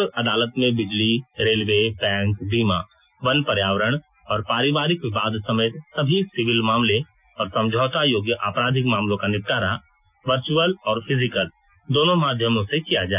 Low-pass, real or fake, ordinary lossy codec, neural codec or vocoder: 3.6 kHz; real; none; none